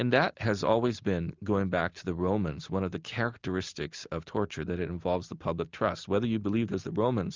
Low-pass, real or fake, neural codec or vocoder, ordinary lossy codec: 7.2 kHz; real; none; Opus, 32 kbps